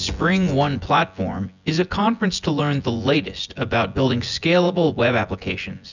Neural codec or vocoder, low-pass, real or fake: vocoder, 24 kHz, 100 mel bands, Vocos; 7.2 kHz; fake